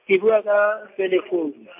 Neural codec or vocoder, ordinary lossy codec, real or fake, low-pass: none; MP3, 32 kbps; real; 3.6 kHz